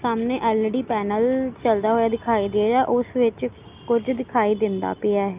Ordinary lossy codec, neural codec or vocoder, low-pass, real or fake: Opus, 24 kbps; none; 3.6 kHz; real